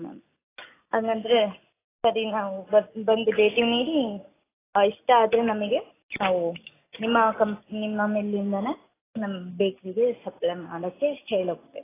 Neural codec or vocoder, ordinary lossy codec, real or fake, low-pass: none; AAC, 16 kbps; real; 3.6 kHz